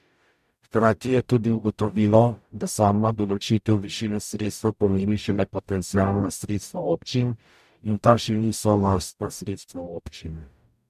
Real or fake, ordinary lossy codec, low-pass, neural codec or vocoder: fake; MP3, 96 kbps; 14.4 kHz; codec, 44.1 kHz, 0.9 kbps, DAC